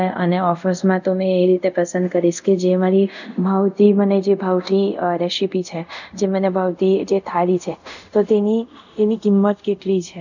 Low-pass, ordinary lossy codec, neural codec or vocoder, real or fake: 7.2 kHz; none; codec, 24 kHz, 0.5 kbps, DualCodec; fake